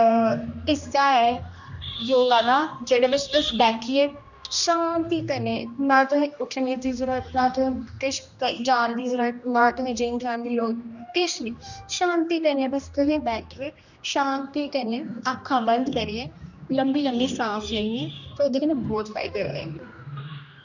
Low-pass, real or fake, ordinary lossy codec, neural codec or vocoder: 7.2 kHz; fake; none; codec, 16 kHz, 1 kbps, X-Codec, HuBERT features, trained on general audio